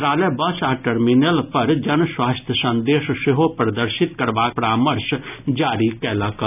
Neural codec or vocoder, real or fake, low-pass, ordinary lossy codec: none; real; 3.6 kHz; none